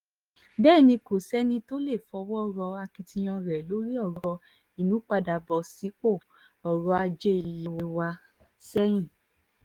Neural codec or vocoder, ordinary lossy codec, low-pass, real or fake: codec, 44.1 kHz, 7.8 kbps, DAC; Opus, 24 kbps; 19.8 kHz; fake